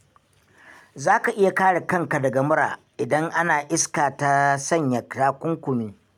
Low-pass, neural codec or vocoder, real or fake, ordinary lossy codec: 19.8 kHz; none; real; none